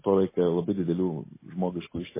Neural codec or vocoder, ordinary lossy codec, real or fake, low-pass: none; MP3, 16 kbps; real; 3.6 kHz